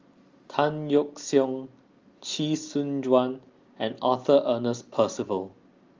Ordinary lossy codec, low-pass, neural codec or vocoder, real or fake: Opus, 32 kbps; 7.2 kHz; none; real